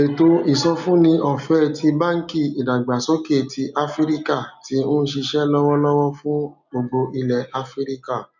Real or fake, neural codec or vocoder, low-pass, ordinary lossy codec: real; none; 7.2 kHz; none